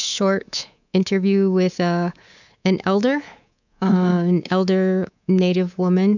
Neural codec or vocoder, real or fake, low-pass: codec, 24 kHz, 3.1 kbps, DualCodec; fake; 7.2 kHz